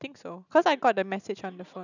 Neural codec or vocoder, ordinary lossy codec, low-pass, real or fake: none; none; 7.2 kHz; real